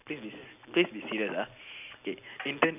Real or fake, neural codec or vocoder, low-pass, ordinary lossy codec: real; none; 3.6 kHz; none